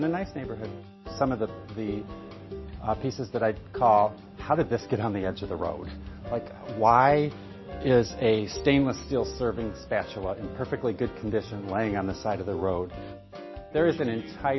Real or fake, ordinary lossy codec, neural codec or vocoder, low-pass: real; MP3, 24 kbps; none; 7.2 kHz